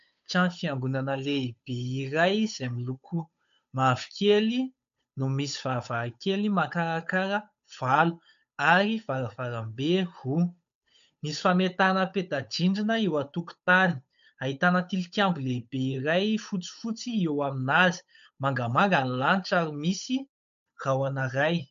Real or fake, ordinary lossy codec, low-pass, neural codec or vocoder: fake; MP3, 64 kbps; 7.2 kHz; codec, 16 kHz, 8 kbps, FunCodec, trained on Chinese and English, 25 frames a second